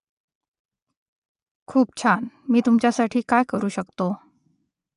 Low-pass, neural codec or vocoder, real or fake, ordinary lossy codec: 10.8 kHz; vocoder, 24 kHz, 100 mel bands, Vocos; fake; none